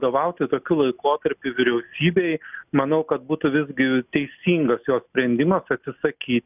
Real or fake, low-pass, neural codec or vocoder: real; 3.6 kHz; none